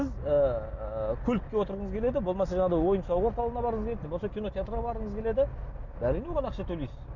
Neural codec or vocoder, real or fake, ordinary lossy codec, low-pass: none; real; none; 7.2 kHz